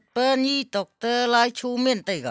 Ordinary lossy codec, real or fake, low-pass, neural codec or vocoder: none; real; none; none